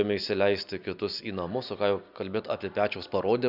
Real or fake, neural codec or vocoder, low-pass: real; none; 5.4 kHz